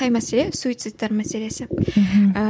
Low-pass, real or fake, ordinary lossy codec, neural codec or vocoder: none; real; none; none